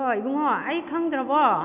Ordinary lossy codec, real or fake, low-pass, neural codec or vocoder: none; real; 3.6 kHz; none